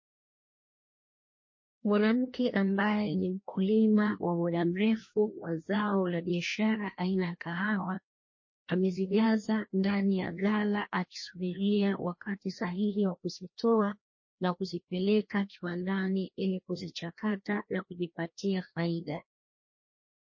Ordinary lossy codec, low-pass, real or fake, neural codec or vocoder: MP3, 32 kbps; 7.2 kHz; fake; codec, 16 kHz, 1 kbps, FreqCodec, larger model